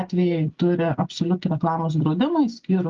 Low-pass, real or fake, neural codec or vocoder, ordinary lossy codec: 7.2 kHz; real; none; Opus, 32 kbps